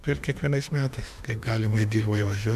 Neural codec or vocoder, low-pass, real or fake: autoencoder, 48 kHz, 32 numbers a frame, DAC-VAE, trained on Japanese speech; 14.4 kHz; fake